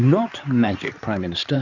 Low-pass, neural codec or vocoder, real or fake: 7.2 kHz; codec, 16 kHz in and 24 kHz out, 2.2 kbps, FireRedTTS-2 codec; fake